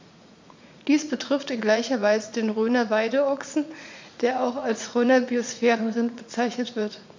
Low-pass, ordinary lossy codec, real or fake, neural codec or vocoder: 7.2 kHz; MP3, 64 kbps; fake; vocoder, 44.1 kHz, 80 mel bands, Vocos